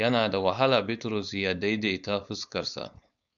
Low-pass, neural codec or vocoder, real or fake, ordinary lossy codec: 7.2 kHz; codec, 16 kHz, 4.8 kbps, FACodec; fake; MP3, 96 kbps